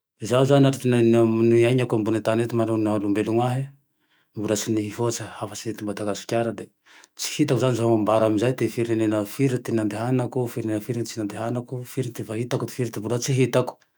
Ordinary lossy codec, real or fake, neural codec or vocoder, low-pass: none; fake; autoencoder, 48 kHz, 128 numbers a frame, DAC-VAE, trained on Japanese speech; none